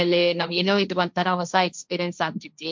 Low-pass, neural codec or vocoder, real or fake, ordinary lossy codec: 7.2 kHz; codec, 16 kHz, 1.1 kbps, Voila-Tokenizer; fake; MP3, 64 kbps